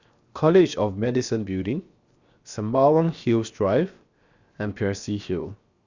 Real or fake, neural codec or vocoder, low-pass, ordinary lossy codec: fake; codec, 16 kHz, 0.7 kbps, FocalCodec; 7.2 kHz; Opus, 64 kbps